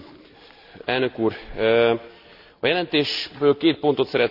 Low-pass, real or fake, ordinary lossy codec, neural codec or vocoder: 5.4 kHz; real; none; none